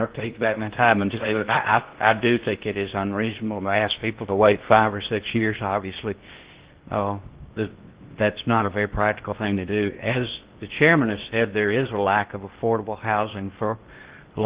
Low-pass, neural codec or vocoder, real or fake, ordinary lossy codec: 3.6 kHz; codec, 16 kHz in and 24 kHz out, 0.6 kbps, FocalCodec, streaming, 2048 codes; fake; Opus, 16 kbps